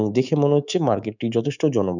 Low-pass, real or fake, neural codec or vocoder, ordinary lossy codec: 7.2 kHz; fake; codec, 24 kHz, 3.1 kbps, DualCodec; none